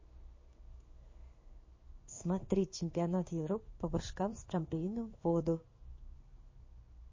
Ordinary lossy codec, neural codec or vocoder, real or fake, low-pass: MP3, 32 kbps; codec, 16 kHz in and 24 kHz out, 1 kbps, XY-Tokenizer; fake; 7.2 kHz